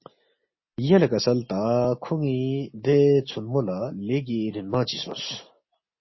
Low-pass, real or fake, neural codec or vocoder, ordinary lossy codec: 7.2 kHz; real; none; MP3, 24 kbps